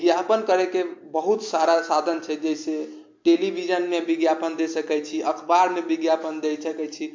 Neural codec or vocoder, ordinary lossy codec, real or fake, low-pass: none; MP3, 48 kbps; real; 7.2 kHz